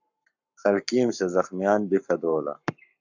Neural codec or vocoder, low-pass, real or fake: codec, 44.1 kHz, 7.8 kbps, Pupu-Codec; 7.2 kHz; fake